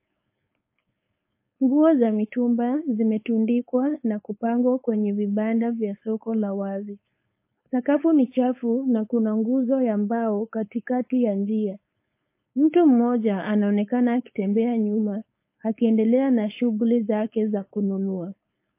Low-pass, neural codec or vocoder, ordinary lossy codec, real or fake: 3.6 kHz; codec, 16 kHz, 4.8 kbps, FACodec; MP3, 24 kbps; fake